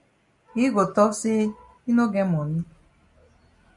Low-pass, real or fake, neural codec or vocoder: 10.8 kHz; real; none